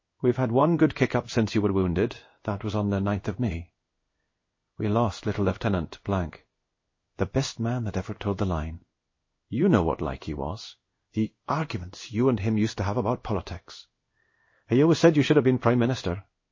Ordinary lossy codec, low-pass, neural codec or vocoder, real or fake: MP3, 32 kbps; 7.2 kHz; codec, 16 kHz in and 24 kHz out, 1 kbps, XY-Tokenizer; fake